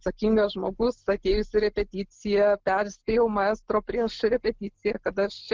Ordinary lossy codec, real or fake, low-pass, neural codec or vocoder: Opus, 16 kbps; real; 7.2 kHz; none